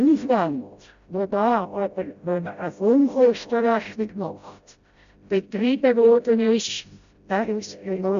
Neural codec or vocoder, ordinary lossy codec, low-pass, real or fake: codec, 16 kHz, 0.5 kbps, FreqCodec, smaller model; Opus, 64 kbps; 7.2 kHz; fake